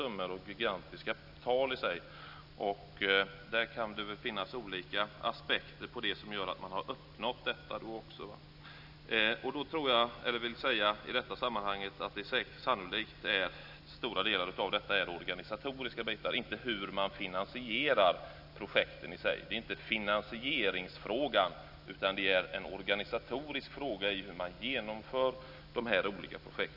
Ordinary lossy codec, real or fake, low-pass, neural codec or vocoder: none; real; 5.4 kHz; none